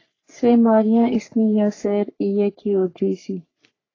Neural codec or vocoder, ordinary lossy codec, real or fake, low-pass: codec, 44.1 kHz, 3.4 kbps, Pupu-Codec; AAC, 32 kbps; fake; 7.2 kHz